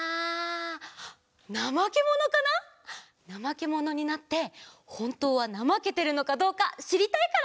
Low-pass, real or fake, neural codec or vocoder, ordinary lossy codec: none; real; none; none